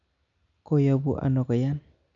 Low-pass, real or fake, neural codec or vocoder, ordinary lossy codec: 7.2 kHz; real; none; none